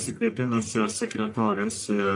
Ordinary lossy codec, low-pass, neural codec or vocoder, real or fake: AAC, 64 kbps; 10.8 kHz; codec, 44.1 kHz, 1.7 kbps, Pupu-Codec; fake